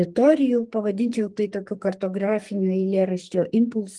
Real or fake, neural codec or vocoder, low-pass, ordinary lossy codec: fake; codec, 44.1 kHz, 2.6 kbps, SNAC; 10.8 kHz; Opus, 24 kbps